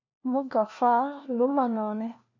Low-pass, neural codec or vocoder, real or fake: 7.2 kHz; codec, 16 kHz, 1 kbps, FunCodec, trained on LibriTTS, 50 frames a second; fake